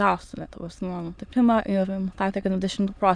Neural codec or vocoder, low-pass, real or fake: autoencoder, 22.05 kHz, a latent of 192 numbers a frame, VITS, trained on many speakers; 9.9 kHz; fake